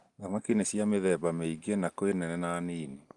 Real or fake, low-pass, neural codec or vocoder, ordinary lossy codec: real; 10.8 kHz; none; Opus, 24 kbps